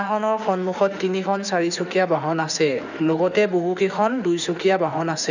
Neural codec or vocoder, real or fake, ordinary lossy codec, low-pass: autoencoder, 48 kHz, 32 numbers a frame, DAC-VAE, trained on Japanese speech; fake; none; 7.2 kHz